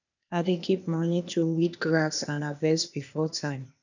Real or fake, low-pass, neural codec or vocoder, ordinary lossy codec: fake; 7.2 kHz; codec, 16 kHz, 0.8 kbps, ZipCodec; none